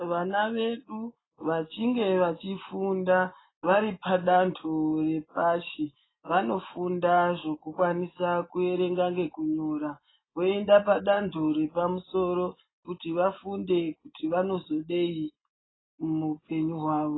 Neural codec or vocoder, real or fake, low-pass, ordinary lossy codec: none; real; 7.2 kHz; AAC, 16 kbps